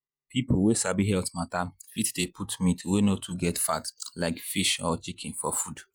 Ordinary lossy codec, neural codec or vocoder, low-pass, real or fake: none; vocoder, 48 kHz, 128 mel bands, Vocos; none; fake